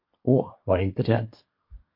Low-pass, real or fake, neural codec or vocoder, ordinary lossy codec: 5.4 kHz; fake; codec, 24 kHz, 1 kbps, SNAC; MP3, 32 kbps